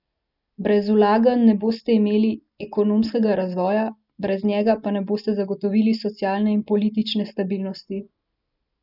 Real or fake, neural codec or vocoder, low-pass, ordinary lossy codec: real; none; 5.4 kHz; none